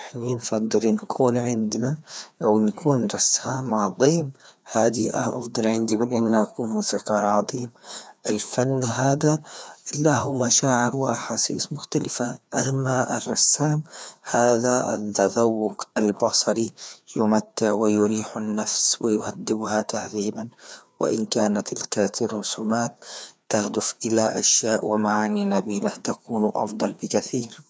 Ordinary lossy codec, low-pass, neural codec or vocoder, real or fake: none; none; codec, 16 kHz, 2 kbps, FreqCodec, larger model; fake